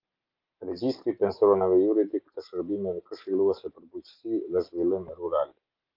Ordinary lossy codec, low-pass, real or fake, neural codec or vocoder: Opus, 32 kbps; 5.4 kHz; real; none